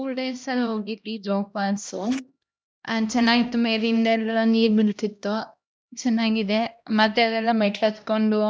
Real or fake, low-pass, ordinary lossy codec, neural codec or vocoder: fake; none; none; codec, 16 kHz, 1 kbps, X-Codec, HuBERT features, trained on LibriSpeech